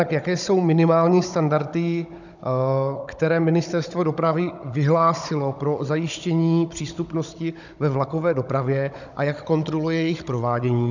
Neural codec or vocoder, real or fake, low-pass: codec, 16 kHz, 16 kbps, FunCodec, trained on Chinese and English, 50 frames a second; fake; 7.2 kHz